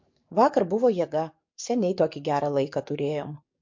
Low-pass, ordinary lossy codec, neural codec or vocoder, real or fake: 7.2 kHz; MP3, 48 kbps; codec, 44.1 kHz, 7.8 kbps, DAC; fake